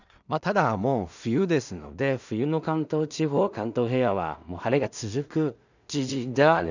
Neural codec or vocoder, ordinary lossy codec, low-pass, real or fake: codec, 16 kHz in and 24 kHz out, 0.4 kbps, LongCat-Audio-Codec, two codebook decoder; none; 7.2 kHz; fake